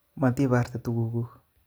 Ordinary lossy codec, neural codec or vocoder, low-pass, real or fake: none; none; none; real